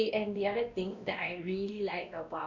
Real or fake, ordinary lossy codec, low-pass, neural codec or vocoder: fake; none; 7.2 kHz; codec, 16 kHz, 1 kbps, X-Codec, WavLM features, trained on Multilingual LibriSpeech